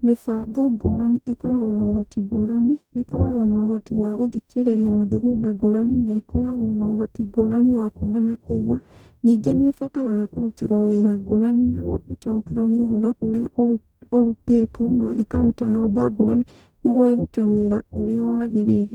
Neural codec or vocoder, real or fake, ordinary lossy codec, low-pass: codec, 44.1 kHz, 0.9 kbps, DAC; fake; none; 19.8 kHz